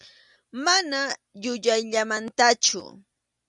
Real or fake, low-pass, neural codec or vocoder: real; 10.8 kHz; none